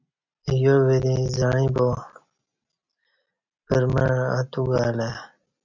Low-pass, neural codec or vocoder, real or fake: 7.2 kHz; none; real